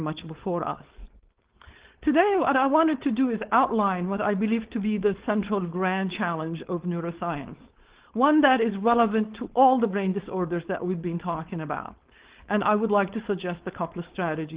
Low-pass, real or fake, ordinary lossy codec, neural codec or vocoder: 3.6 kHz; fake; Opus, 16 kbps; codec, 16 kHz, 4.8 kbps, FACodec